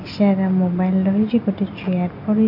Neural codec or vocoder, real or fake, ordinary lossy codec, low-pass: none; real; none; 5.4 kHz